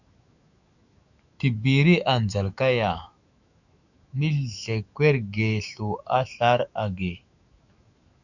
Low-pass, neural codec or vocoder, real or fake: 7.2 kHz; autoencoder, 48 kHz, 128 numbers a frame, DAC-VAE, trained on Japanese speech; fake